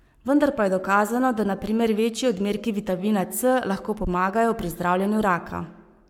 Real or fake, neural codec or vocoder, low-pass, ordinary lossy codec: fake; codec, 44.1 kHz, 7.8 kbps, Pupu-Codec; 19.8 kHz; MP3, 96 kbps